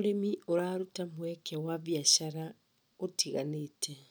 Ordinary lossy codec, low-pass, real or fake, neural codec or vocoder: none; none; real; none